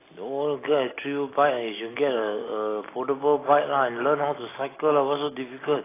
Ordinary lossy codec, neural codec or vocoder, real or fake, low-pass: AAC, 16 kbps; none; real; 3.6 kHz